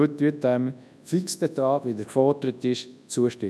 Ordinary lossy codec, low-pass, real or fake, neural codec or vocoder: none; none; fake; codec, 24 kHz, 0.9 kbps, WavTokenizer, large speech release